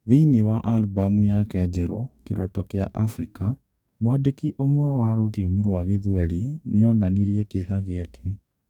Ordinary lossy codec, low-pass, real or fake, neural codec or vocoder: none; 19.8 kHz; fake; codec, 44.1 kHz, 2.6 kbps, DAC